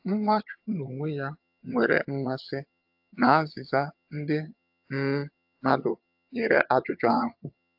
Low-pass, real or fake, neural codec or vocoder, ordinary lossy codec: 5.4 kHz; fake; vocoder, 22.05 kHz, 80 mel bands, HiFi-GAN; AAC, 48 kbps